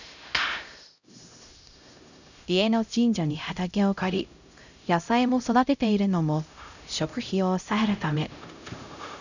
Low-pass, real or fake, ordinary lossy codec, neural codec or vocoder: 7.2 kHz; fake; none; codec, 16 kHz, 0.5 kbps, X-Codec, HuBERT features, trained on LibriSpeech